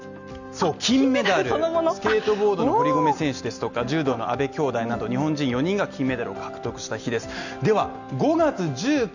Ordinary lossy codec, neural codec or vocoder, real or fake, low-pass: none; none; real; 7.2 kHz